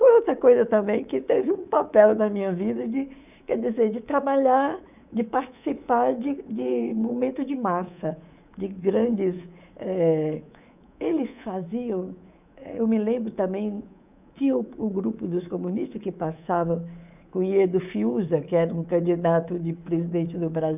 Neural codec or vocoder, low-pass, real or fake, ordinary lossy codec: codec, 24 kHz, 3.1 kbps, DualCodec; 3.6 kHz; fake; none